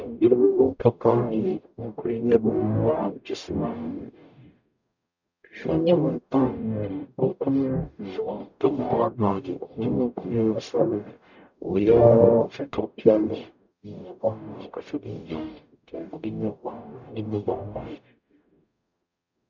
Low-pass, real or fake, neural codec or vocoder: 7.2 kHz; fake; codec, 44.1 kHz, 0.9 kbps, DAC